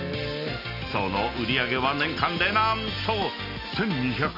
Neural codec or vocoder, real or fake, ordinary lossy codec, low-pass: none; real; none; 5.4 kHz